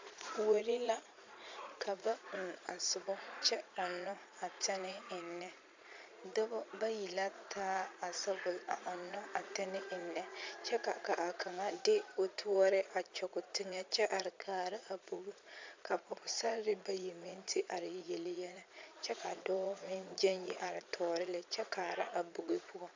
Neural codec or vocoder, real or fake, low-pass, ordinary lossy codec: vocoder, 22.05 kHz, 80 mel bands, Vocos; fake; 7.2 kHz; MP3, 64 kbps